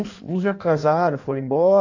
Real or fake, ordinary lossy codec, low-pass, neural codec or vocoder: fake; AAC, 48 kbps; 7.2 kHz; codec, 16 kHz in and 24 kHz out, 1.1 kbps, FireRedTTS-2 codec